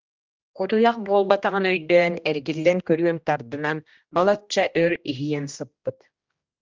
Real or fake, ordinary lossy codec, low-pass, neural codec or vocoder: fake; Opus, 24 kbps; 7.2 kHz; codec, 16 kHz, 1 kbps, X-Codec, HuBERT features, trained on general audio